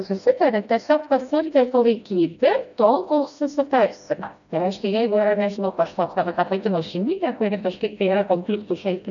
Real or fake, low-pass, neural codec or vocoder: fake; 7.2 kHz; codec, 16 kHz, 1 kbps, FreqCodec, smaller model